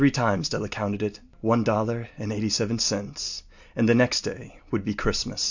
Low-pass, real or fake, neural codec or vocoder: 7.2 kHz; real; none